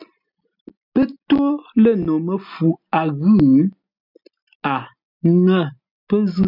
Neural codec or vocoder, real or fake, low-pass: none; real; 5.4 kHz